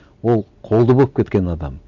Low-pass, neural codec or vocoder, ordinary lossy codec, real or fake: 7.2 kHz; none; none; real